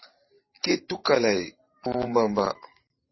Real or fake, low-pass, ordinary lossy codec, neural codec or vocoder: fake; 7.2 kHz; MP3, 24 kbps; vocoder, 44.1 kHz, 128 mel bands every 512 samples, BigVGAN v2